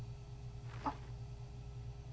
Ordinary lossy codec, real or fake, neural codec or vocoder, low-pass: none; real; none; none